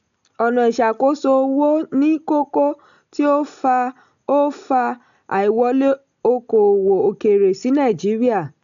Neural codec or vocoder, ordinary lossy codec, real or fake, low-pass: none; none; real; 7.2 kHz